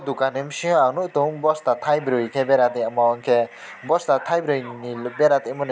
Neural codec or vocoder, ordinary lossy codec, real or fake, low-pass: none; none; real; none